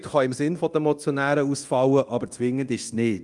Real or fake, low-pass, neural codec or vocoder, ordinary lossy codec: fake; 10.8 kHz; codec, 24 kHz, 0.9 kbps, DualCodec; Opus, 32 kbps